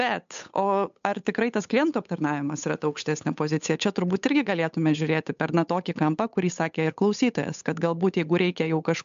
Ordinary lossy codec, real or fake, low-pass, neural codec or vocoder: MP3, 64 kbps; fake; 7.2 kHz; codec, 16 kHz, 8 kbps, FunCodec, trained on Chinese and English, 25 frames a second